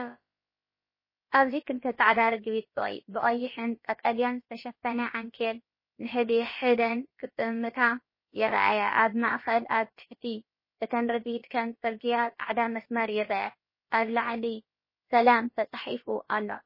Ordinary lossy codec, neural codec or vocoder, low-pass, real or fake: MP3, 24 kbps; codec, 16 kHz, about 1 kbps, DyCAST, with the encoder's durations; 5.4 kHz; fake